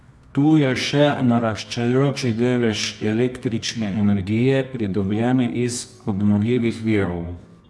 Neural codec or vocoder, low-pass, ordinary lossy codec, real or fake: codec, 24 kHz, 0.9 kbps, WavTokenizer, medium music audio release; none; none; fake